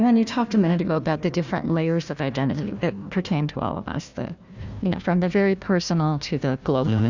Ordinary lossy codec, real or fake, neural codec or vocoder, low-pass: Opus, 64 kbps; fake; codec, 16 kHz, 1 kbps, FunCodec, trained on Chinese and English, 50 frames a second; 7.2 kHz